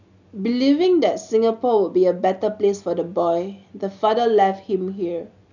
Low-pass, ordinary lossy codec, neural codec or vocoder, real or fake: 7.2 kHz; none; none; real